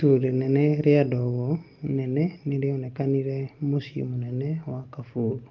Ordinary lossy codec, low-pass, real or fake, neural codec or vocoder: Opus, 32 kbps; 7.2 kHz; real; none